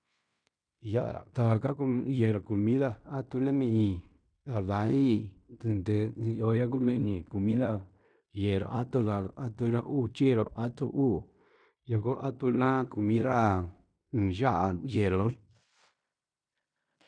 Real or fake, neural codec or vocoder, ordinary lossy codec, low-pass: fake; codec, 16 kHz in and 24 kHz out, 0.9 kbps, LongCat-Audio-Codec, fine tuned four codebook decoder; none; 9.9 kHz